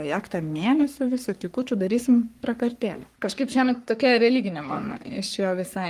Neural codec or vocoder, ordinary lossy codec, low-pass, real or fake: codec, 44.1 kHz, 3.4 kbps, Pupu-Codec; Opus, 32 kbps; 14.4 kHz; fake